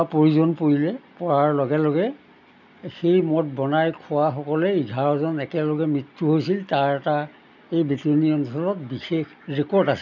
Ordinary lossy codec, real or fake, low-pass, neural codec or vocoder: none; real; 7.2 kHz; none